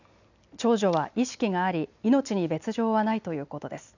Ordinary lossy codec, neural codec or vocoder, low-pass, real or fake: none; none; 7.2 kHz; real